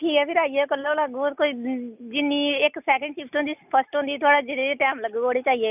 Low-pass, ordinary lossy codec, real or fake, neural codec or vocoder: 3.6 kHz; none; real; none